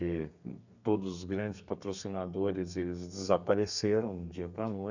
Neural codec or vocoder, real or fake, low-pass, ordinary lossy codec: codec, 32 kHz, 1.9 kbps, SNAC; fake; 7.2 kHz; none